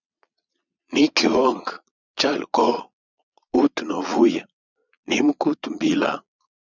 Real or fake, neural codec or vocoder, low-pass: fake; vocoder, 22.05 kHz, 80 mel bands, Vocos; 7.2 kHz